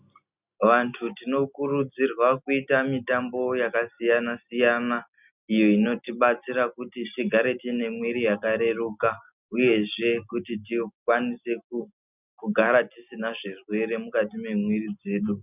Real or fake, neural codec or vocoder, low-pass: real; none; 3.6 kHz